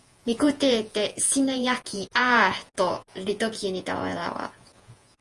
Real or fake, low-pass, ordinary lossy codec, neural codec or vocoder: fake; 10.8 kHz; Opus, 32 kbps; vocoder, 48 kHz, 128 mel bands, Vocos